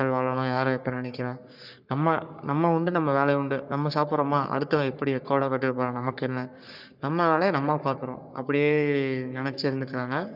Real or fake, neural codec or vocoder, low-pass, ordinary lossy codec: fake; codec, 44.1 kHz, 3.4 kbps, Pupu-Codec; 5.4 kHz; none